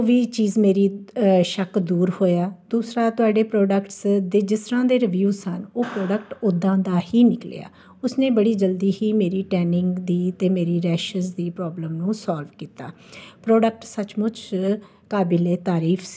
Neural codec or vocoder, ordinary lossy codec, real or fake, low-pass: none; none; real; none